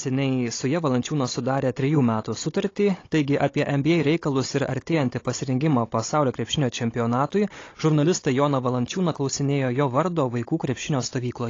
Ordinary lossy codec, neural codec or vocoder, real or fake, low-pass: AAC, 32 kbps; codec, 16 kHz, 16 kbps, FunCodec, trained on Chinese and English, 50 frames a second; fake; 7.2 kHz